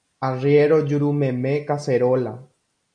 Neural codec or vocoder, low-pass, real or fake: none; 9.9 kHz; real